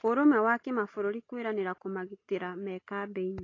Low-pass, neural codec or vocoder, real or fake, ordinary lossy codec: 7.2 kHz; none; real; AAC, 32 kbps